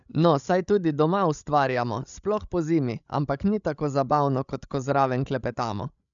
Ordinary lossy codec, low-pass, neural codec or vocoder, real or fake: none; 7.2 kHz; codec, 16 kHz, 16 kbps, FreqCodec, larger model; fake